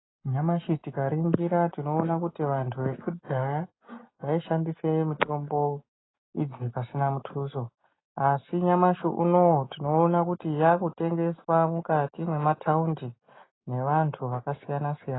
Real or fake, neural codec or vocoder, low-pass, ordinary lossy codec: real; none; 7.2 kHz; AAC, 16 kbps